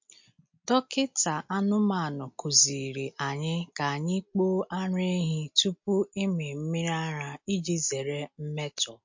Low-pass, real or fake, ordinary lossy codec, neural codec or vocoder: 7.2 kHz; real; MP3, 48 kbps; none